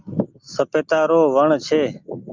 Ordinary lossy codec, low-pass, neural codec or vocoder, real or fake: Opus, 24 kbps; 7.2 kHz; none; real